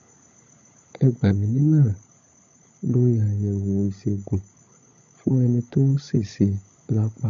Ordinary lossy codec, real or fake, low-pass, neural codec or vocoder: MP3, 64 kbps; fake; 7.2 kHz; codec, 16 kHz, 16 kbps, FunCodec, trained on LibriTTS, 50 frames a second